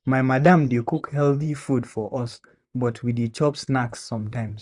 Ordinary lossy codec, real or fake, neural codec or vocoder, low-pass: none; fake; vocoder, 44.1 kHz, 128 mel bands, Pupu-Vocoder; 10.8 kHz